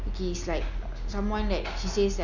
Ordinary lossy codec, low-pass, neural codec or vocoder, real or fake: none; 7.2 kHz; none; real